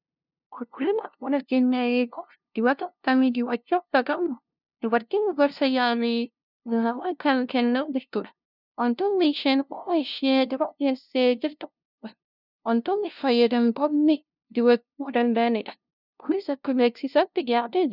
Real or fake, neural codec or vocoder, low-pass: fake; codec, 16 kHz, 0.5 kbps, FunCodec, trained on LibriTTS, 25 frames a second; 5.4 kHz